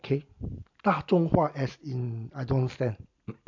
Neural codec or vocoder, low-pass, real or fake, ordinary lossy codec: none; 7.2 kHz; real; MP3, 64 kbps